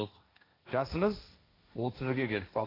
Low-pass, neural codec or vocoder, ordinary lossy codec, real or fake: 5.4 kHz; codec, 16 kHz, 1.1 kbps, Voila-Tokenizer; AAC, 24 kbps; fake